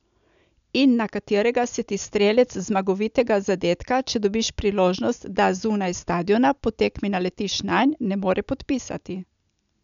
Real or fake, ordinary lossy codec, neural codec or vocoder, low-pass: real; none; none; 7.2 kHz